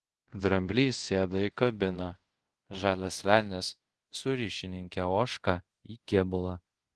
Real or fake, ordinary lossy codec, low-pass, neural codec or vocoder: fake; Opus, 16 kbps; 10.8 kHz; codec, 24 kHz, 0.5 kbps, DualCodec